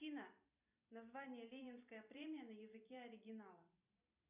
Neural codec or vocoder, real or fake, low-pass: vocoder, 44.1 kHz, 128 mel bands every 256 samples, BigVGAN v2; fake; 3.6 kHz